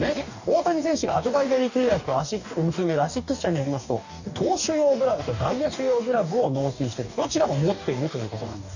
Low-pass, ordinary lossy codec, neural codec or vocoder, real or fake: 7.2 kHz; none; codec, 44.1 kHz, 2.6 kbps, DAC; fake